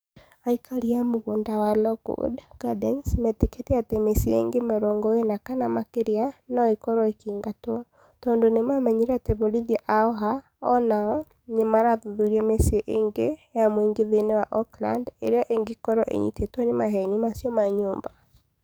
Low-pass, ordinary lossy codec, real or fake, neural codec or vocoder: none; none; fake; codec, 44.1 kHz, 7.8 kbps, DAC